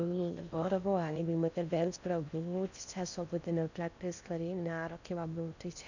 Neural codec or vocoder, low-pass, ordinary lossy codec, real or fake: codec, 16 kHz in and 24 kHz out, 0.6 kbps, FocalCodec, streaming, 4096 codes; 7.2 kHz; none; fake